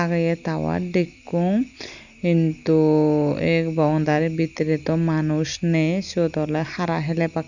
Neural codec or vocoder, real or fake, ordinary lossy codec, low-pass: none; real; none; 7.2 kHz